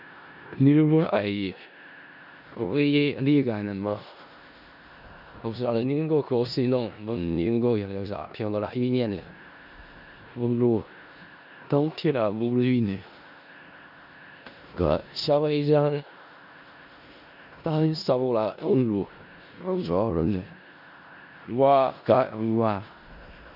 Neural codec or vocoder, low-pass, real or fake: codec, 16 kHz in and 24 kHz out, 0.4 kbps, LongCat-Audio-Codec, four codebook decoder; 5.4 kHz; fake